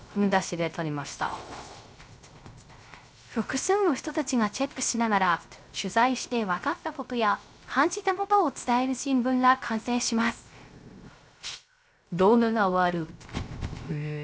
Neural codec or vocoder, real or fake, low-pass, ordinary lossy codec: codec, 16 kHz, 0.3 kbps, FocalCodec; fake; none; none